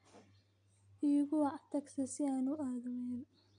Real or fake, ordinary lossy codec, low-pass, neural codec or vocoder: real; none; 9.9 kHz; none